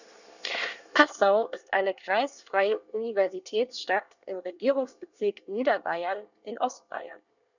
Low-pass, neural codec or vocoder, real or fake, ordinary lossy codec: 7.2 kHz; codec, 24 kHz, 1 kbps, SNAC; fake; none